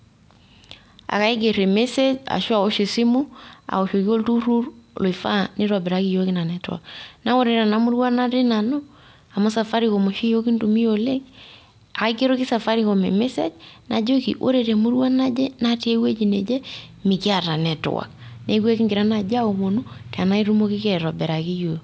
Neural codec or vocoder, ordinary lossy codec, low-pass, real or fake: none; none; none; real